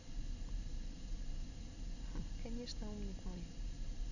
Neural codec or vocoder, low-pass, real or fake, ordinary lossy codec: none; 7.2 kHz; real; none